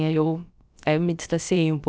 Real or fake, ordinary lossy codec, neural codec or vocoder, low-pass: fake; none; codec, 16 kHz, about 1 kbps, DyCAST, with the encoder's durations; none